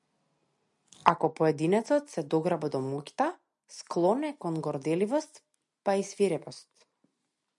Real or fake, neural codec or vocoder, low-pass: real; none; 10.8 kHz